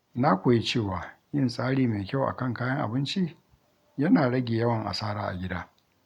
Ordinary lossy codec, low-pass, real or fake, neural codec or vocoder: MP3, 96 kbps; 19.8 kHz; real; none